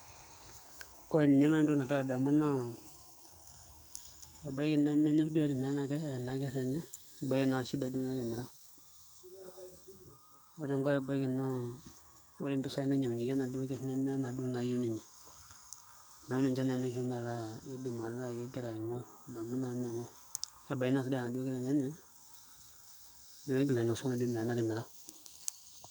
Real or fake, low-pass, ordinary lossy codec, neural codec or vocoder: fake; none; none; codec, 44.1 kHz, 2.6 kbps, SNAC